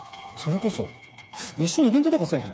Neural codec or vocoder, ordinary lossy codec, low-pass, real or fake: codec, 16 kHz, 4 kbps, FreqCodec, smaller model; none; none; fake